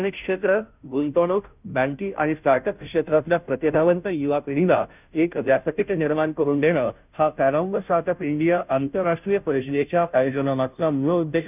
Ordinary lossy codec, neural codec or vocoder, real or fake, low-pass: none; codec, 16 kHz, 0.5 kbps, FunCodec, trained on Chinese and English, 25 frames a second; fake; 3.6 kHz